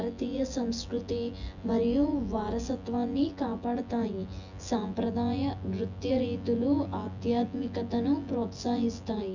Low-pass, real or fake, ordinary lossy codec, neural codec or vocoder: 7.2 kHz; fake; none; vocoder, 24 kHz, 100 mel bands, Vocos